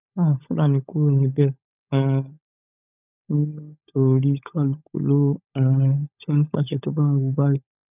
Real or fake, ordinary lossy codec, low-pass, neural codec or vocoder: fake; none; 3.6 kHz; codec, 16 kHz, 16 kbps, FunCodec, trained on LibriTTS, 50 frames a second